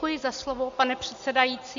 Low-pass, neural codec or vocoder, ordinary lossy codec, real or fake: 7.2 kHz; none; AAC, 64 kbps; real